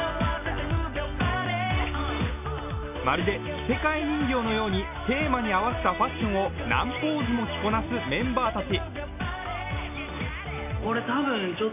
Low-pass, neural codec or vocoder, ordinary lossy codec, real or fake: 3.6 kHz; none; MP3, 32 kbps; real